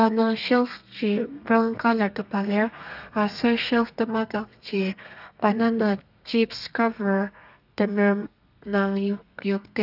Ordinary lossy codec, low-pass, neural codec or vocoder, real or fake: none; 5.4 kHz; codec, 32 kHz, 1.9 kbps, SNAC; fake